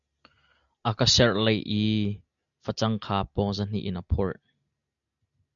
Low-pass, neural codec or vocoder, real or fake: 7.2 kHz; none; real